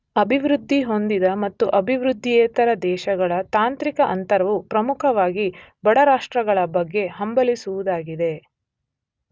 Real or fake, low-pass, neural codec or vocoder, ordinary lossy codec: real; none; none; none